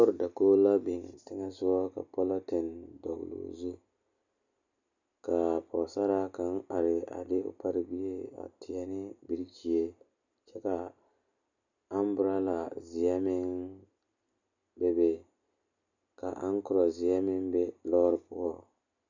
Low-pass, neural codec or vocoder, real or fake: 7.2 kHz; none; real